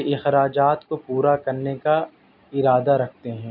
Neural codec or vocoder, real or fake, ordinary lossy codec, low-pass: none; real; none; 5.4 kHz